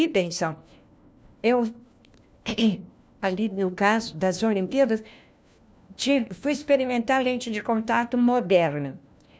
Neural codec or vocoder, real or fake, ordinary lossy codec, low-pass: codec, 16 kHz, 1 kbps, FunCodec, trained on LibriTTS, 50 frames a second; fake; none; none